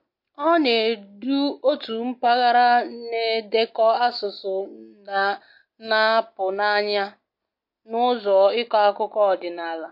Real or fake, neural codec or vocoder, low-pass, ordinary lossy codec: real; none; 5.4 kHz; MP3, 32 kbps